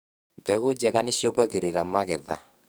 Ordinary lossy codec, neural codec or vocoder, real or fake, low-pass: none; codec, 44.1 kHz, 2.6 kbps, SNAC; fake; none